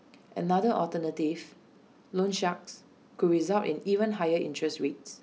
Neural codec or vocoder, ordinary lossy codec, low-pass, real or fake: none; none; none; real